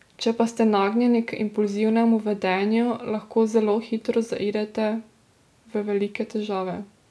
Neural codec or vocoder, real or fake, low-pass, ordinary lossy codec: none; real; none; none